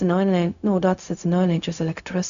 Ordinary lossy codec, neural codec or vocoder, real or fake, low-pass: MP3, 96 kbps; codec, 16 kHz, 0.4 kbps, LongCat-Audio-Codec; fake; 7.2 kHz